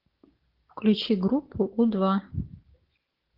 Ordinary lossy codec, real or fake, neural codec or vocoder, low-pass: Opus, 16 kbps; fake; codec, 16 kHz, 6 kbps, DAC; 5.4 kHz